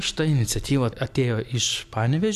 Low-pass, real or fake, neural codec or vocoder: 14.4 kHz; real; none